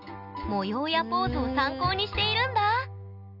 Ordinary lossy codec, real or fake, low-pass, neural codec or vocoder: none; real; 5.4 kHz; none